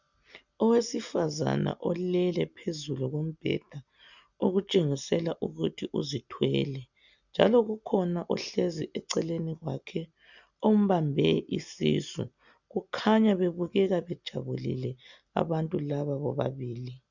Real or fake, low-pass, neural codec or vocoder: real; 7.2 kHz; none